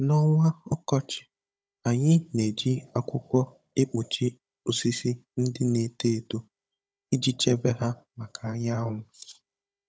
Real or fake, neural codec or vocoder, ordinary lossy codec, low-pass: fake; codec, 16 kHz, 16 kbps, FunCodec, trained on Chinese and English, 50 frames a second; none; none